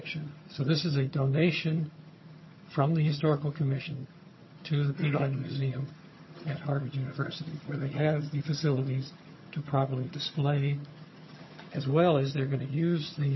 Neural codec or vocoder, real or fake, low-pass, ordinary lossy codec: vocoder, 22.05 kHz, 80 mel bands, HiFi-GAN; fake; 7.2 kHz; MP3, 24 kbps